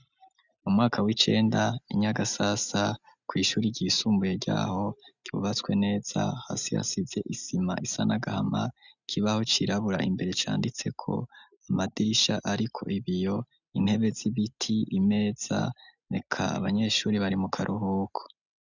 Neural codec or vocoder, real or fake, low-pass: none; real; 7.2 kHz